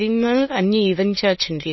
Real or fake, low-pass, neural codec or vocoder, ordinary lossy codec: fake; 7.2 kHz; autoencoder, 22.05 kHz, a latent of 192 numbers a frame, VITS, trained on many speakers; MP3, 24 kbps